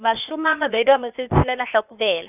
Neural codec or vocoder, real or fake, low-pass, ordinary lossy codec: codec, 16 kHz, 0.8 kbps, ZipCodec; fake; 3.6 kHz; none